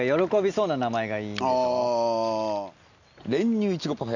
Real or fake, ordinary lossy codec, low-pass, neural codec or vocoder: real; none; 7.2 kHz; none